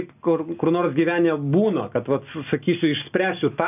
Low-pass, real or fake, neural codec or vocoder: 3.6 kHz; real; none